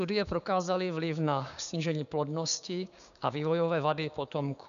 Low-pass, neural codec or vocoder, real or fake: 7.2 kHz; codec, 16 kHz, 6 kbps, DAC; fake